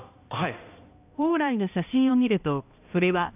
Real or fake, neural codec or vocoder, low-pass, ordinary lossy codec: fake; codec, 16 kHz, 1 kbps, X-Codec, HuBERT features, trained on balanced general audio; 3.6 kHz; AAC, 32 kbps